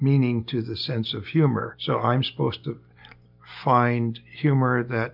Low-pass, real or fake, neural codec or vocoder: 5.4 kHz; real; none